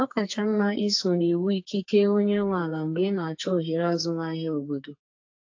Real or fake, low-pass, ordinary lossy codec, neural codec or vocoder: fake; 7.2 kHz; AAC, 48 kbps; codec, 44.1 kHz, 2.6 kbps, SNAC